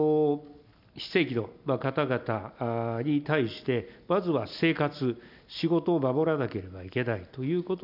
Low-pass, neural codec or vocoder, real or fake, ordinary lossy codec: 5.4 kHz; none; real; none